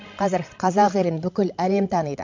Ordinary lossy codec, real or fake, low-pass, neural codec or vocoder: MP3, 48 kbps; fake; 7.2 kHz; codec, 16 kHz, 16 kbps, FreqCodec, larger model